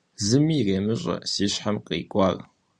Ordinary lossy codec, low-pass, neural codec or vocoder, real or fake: Opus, 64 kbps; 9.9 kHz; none; real